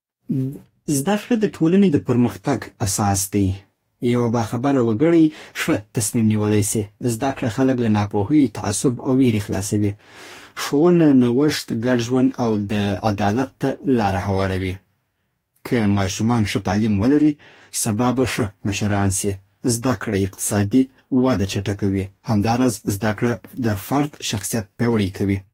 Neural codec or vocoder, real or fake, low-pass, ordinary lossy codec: codec, 44.1 kHz, 2.6 kbps, DAC; fake; 19.8 kHz; AAC, 48 kbps